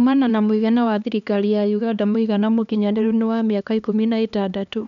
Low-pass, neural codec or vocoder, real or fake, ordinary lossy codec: 7.2 kHz; codec, 16 kHz, 2 kbps, X-Codec, HuBERT features, trained on LibriSpeech; fake; none